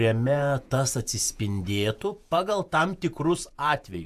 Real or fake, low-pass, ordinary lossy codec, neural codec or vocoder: fake; 14.4 kHz; AAC, 96 kbps; vocoder, 48 kHz, 128 mel bands, Vocos